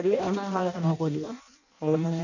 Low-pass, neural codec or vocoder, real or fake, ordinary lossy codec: 7.2 kHz; codec, 16 kHz in and 24 kHz out, 0.6 kbps, FireRedTTS-2 codec; fake; none